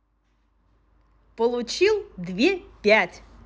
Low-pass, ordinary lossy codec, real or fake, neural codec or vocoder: none; none; real; none